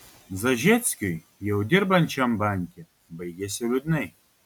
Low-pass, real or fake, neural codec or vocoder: 19.8 kHz; real; none